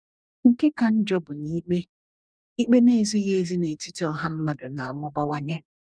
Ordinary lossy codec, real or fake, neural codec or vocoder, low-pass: none; fake; codec, 44.1 kHz, 2.6 kbps, DAC; 9.9 kHz